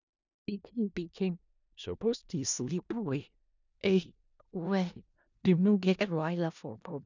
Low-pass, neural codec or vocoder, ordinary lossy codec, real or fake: 7.2 kHz; codec, 16 kHz in and 24 kHz out, 0.4 kbps, LongCat-Audio-Codec, four codebook decoder; none; fake